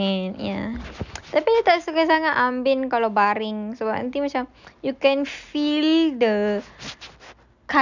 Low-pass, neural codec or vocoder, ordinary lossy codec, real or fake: 7.2 kHz; none; none; real